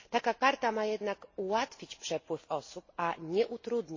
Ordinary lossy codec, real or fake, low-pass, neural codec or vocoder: MP3, 32 kbps; real; 7.2 kHz; none